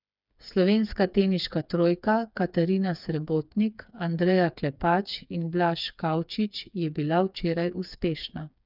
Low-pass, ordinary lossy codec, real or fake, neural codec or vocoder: 5.4 kHz; none; fake; codec, 16 kHz, 4 kbps, FreqCodec, smaller model